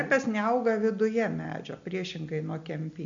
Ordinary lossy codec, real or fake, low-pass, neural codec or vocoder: MP3, 64 kbps; real; 7.2 kHz; none